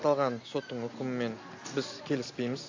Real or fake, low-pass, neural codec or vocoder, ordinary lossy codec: real; 7.2 kHz; none; AAC, 48 kbps